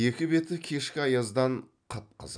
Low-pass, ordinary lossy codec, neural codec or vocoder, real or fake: 9.9 kHz; none; none; real